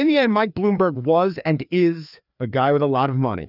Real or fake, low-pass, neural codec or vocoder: fake; 5.4 kHz; codec, 16 kHz, 2 kbps, FreqCodec, larger model